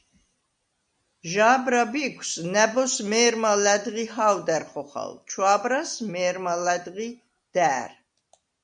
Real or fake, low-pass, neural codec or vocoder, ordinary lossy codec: real; 9.9 kHz; none; MP3, 96 kbps